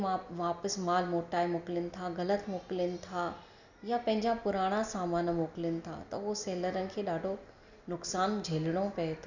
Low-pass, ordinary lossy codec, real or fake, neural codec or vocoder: 7.2 kHz; none; real; none